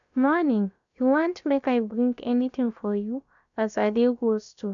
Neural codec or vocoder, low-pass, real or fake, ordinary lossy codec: codec, 16 kHz, about 1 kbps, DyCAST, with the encoder's durations; 7.2 kHz; fake; none